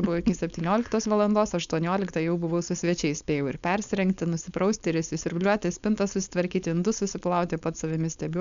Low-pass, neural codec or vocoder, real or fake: 7.2 kHz; codec, 16 kHz, 4.8 kbps, FACodec; fake